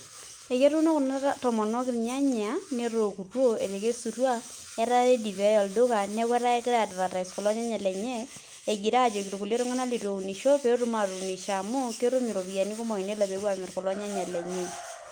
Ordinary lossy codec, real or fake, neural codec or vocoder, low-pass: Opus, 32 kbps; fake; autoencoder, 48 kHz, 128 numbers a frame, DAC-VAE, trained on Japanese speech; 19.8 kHz